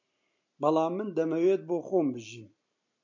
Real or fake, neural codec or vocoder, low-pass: real; none; 7.2 kHz